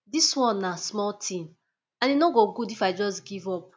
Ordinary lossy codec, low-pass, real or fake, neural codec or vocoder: none; none; real; none